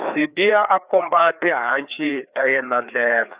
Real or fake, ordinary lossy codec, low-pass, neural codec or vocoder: fake; Opus, 64 kbps; 3.6 kHz; codec, 16 kHz, 2 kbps, FreqCodec, larger model